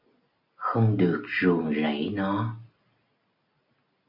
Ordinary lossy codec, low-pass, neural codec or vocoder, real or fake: AAC, 48 kbps; 5.4 kHz; none; real